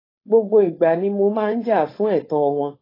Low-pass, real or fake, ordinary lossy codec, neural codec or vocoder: 5.4 kHz; fake; AAC, 24 kbps; codec, 16 kHz, 4.8 kbps, FACodec